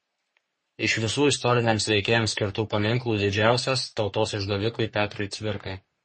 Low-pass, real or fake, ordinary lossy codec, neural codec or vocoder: 10.8 kHz; fake; MP3, 32 kbps; codec, 44.1 kHz, 3.4 kbps, Pupu-Codec